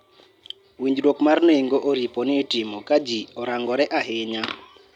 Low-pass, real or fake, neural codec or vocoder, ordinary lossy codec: 19.8 kHz; real; none; none